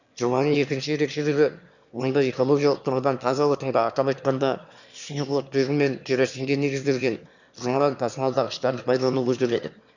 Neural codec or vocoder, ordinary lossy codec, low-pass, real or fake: autoencoder, 22.05 kHz, a latent of 192 numbers a frame, VITS, trained on one speaker; none; 7.2 kHz; fake